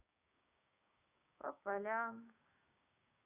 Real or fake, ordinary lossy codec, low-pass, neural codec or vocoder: fake; none; 3.6 kHz; codec, 16 kHz, 6 kbps, DAC